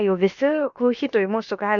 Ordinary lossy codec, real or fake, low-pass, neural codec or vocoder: MP3, 96 kbps; fake; 7.2 kHz; codec, 16 kHz, about 1 kbps, DyCAST, with the encoder's durations